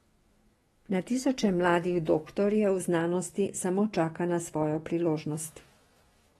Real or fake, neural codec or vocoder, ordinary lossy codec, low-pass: fake; autoencoder, 48 kHz, 128 numbers a frame, DAC-VAE, trained on Japanese speech; AAC, 32 kbps; 19.8 kHz